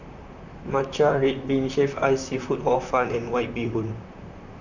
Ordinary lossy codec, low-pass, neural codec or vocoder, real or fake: none; 7.2 kHz; vocoder, 44.1 kHz, 128 mel bands, Pupu-Vocoder; fake